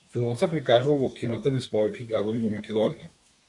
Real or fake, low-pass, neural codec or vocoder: fake; 10.8 kHz; codec, 24 kHz, 1 kbps, SNAC